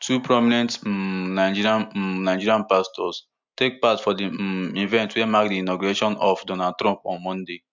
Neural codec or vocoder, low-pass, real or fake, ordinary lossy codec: none; 7.2 kHz; real; MP3, 64 kbps